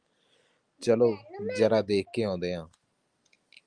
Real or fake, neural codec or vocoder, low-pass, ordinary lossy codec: real; none; 9.9 kHz; Opus, 32 kbps